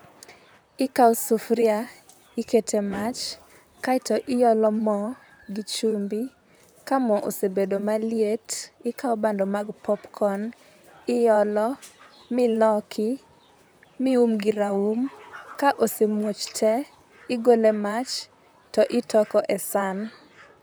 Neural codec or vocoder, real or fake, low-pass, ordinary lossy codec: vocoder, 44.1 kHz, 128 mel bands, Pupu-Vocoder; fake; none; none